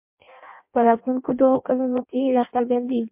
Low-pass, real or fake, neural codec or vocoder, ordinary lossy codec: 3.6 kHz; fake; codec, 16 kHz in and 24 kHz out, 0.6 kbps, FireRedTTS-2 codec; MP3, 32 kbps